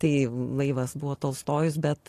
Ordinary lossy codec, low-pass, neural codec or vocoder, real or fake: AAC, 48 kbps; 14.4 kHz; none; real